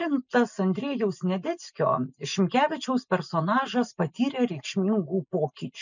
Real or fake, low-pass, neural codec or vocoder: real; 7.2 kHz; none